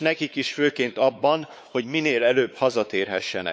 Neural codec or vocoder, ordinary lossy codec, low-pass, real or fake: codec, 16 kHz, 4 kbps, X-Codec, WavLM features, trained on Multilingual LibriSpeech; none; none; fake